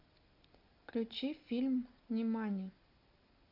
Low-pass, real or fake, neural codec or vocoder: 5.4 kHz; real; none